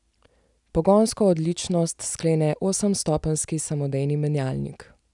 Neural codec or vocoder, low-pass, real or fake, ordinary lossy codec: none; 10.8 kHz; real; none